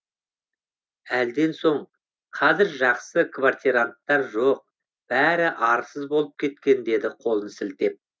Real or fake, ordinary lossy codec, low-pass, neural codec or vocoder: real; none; none; none